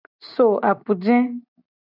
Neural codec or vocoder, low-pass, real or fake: none; 5.4 kHz; real